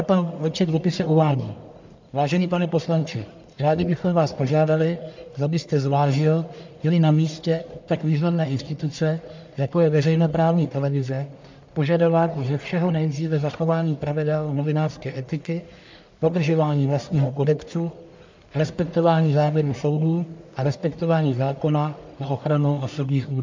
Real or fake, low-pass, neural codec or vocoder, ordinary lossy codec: fake; 7.2 kHz; codec, 44.1 kHz, 1.7 kbps, Pupu-Codec; MP3, 64 kbps